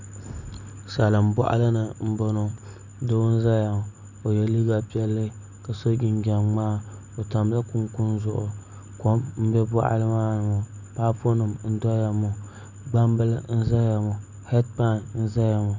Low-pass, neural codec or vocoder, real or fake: 7.2 kHz; none; real